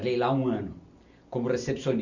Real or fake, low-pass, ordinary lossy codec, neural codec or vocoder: real; 7.2 kHz; none; none